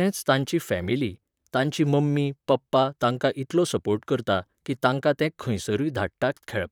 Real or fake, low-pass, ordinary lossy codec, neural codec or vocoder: fake; 19.8 kHz; none; vocoder, 44.1 kHz, 128 mel bands, Pupu-Vocoder